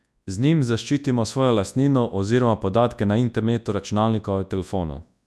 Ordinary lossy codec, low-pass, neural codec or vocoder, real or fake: none; none; codec, 24 kHz, 0.9 kbps, WavTokenizer, large speech release; fake